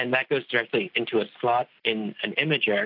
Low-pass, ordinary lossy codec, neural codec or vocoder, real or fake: 5.4 kHz; AAC, 48 kbps; none; real